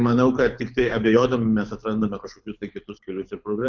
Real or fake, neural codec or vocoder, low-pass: fake; codec, 24 kHz, 6 kbps, HILCodec; 7.2 kHz